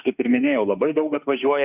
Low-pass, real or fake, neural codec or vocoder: 3.6 kHz; fake; autoencoder, 48 kHz, 32 numbers a frame, DAC-VAE, trained on Japanese speech